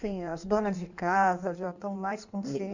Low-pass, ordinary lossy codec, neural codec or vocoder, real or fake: 7.2 kHz; none; codec, 16 kHz in and 24 kHz out, 1.1 kbps, FireRedTTS-2 codec; fake